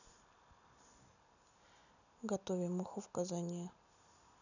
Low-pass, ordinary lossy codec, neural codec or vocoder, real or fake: 7.2 kHz; none; none; real